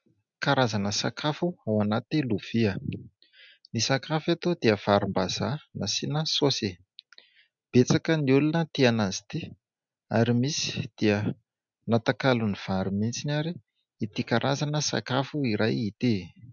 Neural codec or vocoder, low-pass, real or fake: none; 7.2 kHz; real